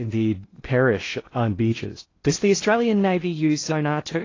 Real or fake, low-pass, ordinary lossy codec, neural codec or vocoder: fake; 7.2 kHz; AAC, 32 kbps; codec, 16 kHz in and 24 kHz out, 0.8 kbps, FocalCodec, streaming, 65536 codes